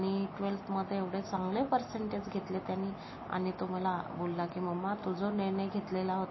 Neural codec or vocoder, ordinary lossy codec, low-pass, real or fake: none; MP3, 24 kbps; 7.2 kHz; real